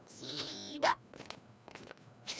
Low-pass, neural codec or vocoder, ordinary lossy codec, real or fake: none; codec, 16 kHz, 1 kbps, FreqCodec, larger model; none; fake